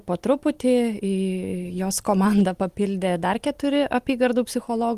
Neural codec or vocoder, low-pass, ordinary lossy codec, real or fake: none; 14.4 kHz; Opus, 24 kbps; real